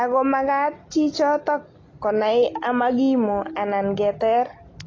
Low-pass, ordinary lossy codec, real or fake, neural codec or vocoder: 7.2 kHz; AAC, 32 kbps; real; none